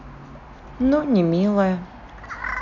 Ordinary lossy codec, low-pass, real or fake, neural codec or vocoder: none; 7.2 kHz; real; none